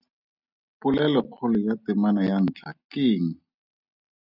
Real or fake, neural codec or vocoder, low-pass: real; none; 5.4 kHz